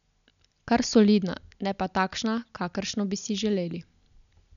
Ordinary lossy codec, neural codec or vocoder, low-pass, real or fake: none; none; 7.2 kHz; real